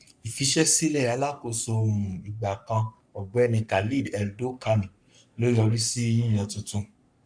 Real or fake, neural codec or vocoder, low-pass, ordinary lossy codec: fake; codec, 44.1 kHz, 3.4 kbps, Pupu-Codec; 9.9 kHz; none